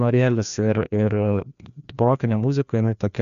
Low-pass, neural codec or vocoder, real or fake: 7.2 kHz; codec, 16 kHz, 1 kbps, FreqCodec, larger model; fake